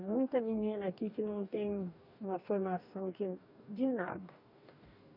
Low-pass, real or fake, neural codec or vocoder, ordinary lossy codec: 5.4 kHz; fake; codec, 44.1 kHz, 2.6 kbps, DAC; none